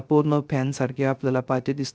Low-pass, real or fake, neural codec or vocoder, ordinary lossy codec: none; fake; codec, 16 kHz, 0.3 kbps, FocalCodec; none